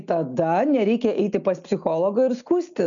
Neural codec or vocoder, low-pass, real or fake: none; 7.2 kHz; real